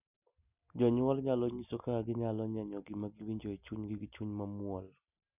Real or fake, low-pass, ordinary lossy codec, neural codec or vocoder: real; 3.6 kHz; none; none